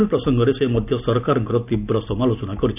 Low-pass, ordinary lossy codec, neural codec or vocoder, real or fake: 3.6 kHz; none; none; real